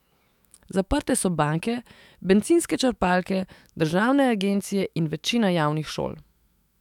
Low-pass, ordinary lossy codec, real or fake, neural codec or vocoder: 19.8 kHz; none; fake; autoencoder, 48 kHz, 128 numbers a frame, DAC-VAE, trained on Japanese speech